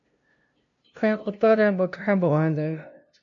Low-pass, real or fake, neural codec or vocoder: 7.2 kHz; fake; codec, 16 kHz, 0.5 kbps, FunCodec, trained on LibriTTS, 25 frames a second